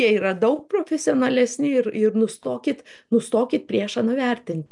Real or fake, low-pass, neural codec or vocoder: real; 10.8 kHz; none